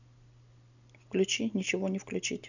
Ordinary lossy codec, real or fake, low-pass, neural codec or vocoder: none; real; 7.2 kHz; none